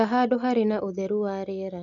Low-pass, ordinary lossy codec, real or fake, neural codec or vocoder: 7.2 kHz; none; real; none